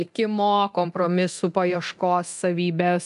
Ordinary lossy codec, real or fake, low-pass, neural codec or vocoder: MP3, 96 kbps; fake; 10.8 kHz; codec, 24 kHz, 0.9 kbps, DualCodec